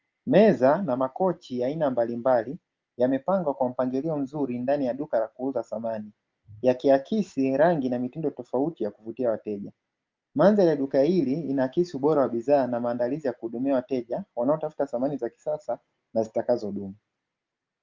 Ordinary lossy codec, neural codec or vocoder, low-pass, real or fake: Opus, 24 kbps; none; 7.2 kHz; real